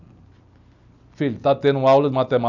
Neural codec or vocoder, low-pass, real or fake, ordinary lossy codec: none; 7.2 kHz; real; none